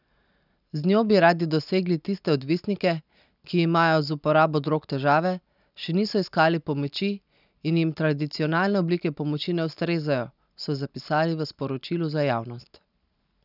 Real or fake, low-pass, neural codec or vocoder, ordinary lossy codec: real; 5.4 kHz; none; none